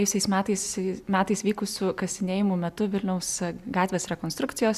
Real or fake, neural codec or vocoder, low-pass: real; none; 14.4 kHz